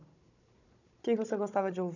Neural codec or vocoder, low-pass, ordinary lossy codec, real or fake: codec, 16 kHz, 16 kbps, FunCodec, trained on Chinese and English, 50 frames a second; 7.2 kHz; none; fake